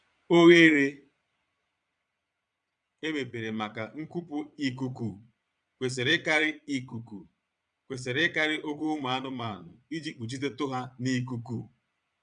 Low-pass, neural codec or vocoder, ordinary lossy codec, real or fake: 9.9 kHz; vocoder, 22.05 kHz, 80 mel bands, Vocos; none; fake